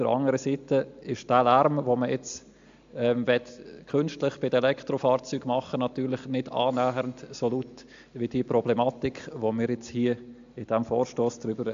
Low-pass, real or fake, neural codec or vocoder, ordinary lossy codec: 7.2 kHz; real; none; AAC, 64 kbps